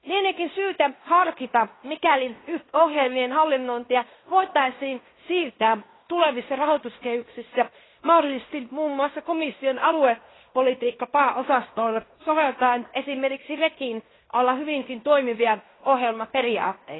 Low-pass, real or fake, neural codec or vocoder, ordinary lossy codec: 7.2 kHz; fake; codec, 16 kHz in and 24 kHz out, 0.9 kbps, LongCat-Audio-Codec, fine tuned four codebook decoder; AAC, 16 kbps